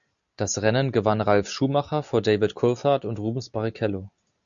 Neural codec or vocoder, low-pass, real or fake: none; 7.2 kHz; real